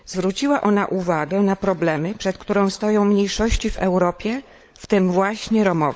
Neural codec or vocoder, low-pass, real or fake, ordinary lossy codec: codec, 16 kHz, 16 kbps, FunCodec, trained on LibriTTS, 50 frames a second; none; fake; none